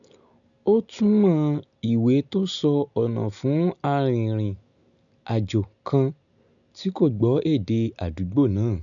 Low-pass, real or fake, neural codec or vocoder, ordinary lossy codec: 7.2 kHz; real; none; none